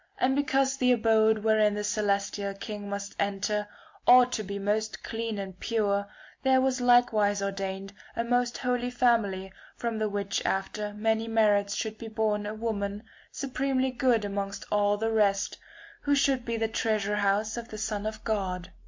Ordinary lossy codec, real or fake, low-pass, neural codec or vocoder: MP3, 48 kbps; real; 7.2 kHz; none